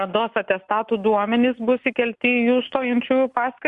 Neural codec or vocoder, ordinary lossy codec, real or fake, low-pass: none; Opus, 64 kbps; real; 10.8 kHz